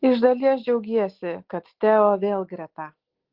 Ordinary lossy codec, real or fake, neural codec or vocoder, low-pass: Opus, 16 kbps; real; none; 5.4 kHz